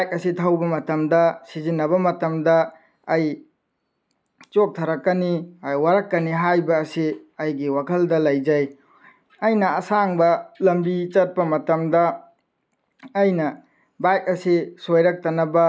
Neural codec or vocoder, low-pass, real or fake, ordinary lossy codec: none; none; real; none